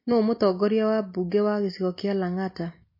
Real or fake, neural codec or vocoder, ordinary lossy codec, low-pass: real; none; MP3, 24 kbps; 5.4 kHz